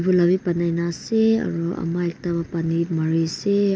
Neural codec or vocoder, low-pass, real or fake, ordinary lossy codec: none; none; real; none